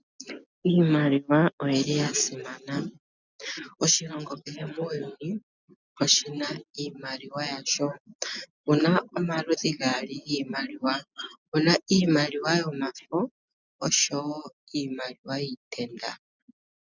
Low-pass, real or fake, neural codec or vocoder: 7.2 kHz; real; none